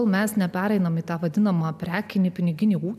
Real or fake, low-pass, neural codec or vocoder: real; 14.4 kHz; none